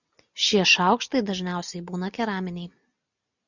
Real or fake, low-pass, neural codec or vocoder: real; 7.2 kHz; none